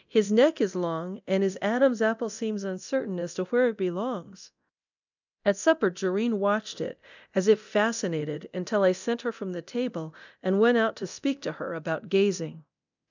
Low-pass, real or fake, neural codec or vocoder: 7.2 kHz; fake; codec, 24 kHz, 0.9 kbps, DualCodec